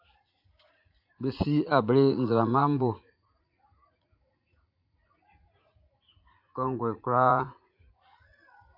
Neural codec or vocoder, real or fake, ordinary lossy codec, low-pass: vocoder, 44.1 kHz, 80 mel bands, Vocos; fake; AAC, 48 kbps; 5.4 kHz